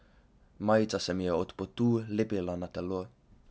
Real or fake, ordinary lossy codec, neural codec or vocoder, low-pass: real; none; none; none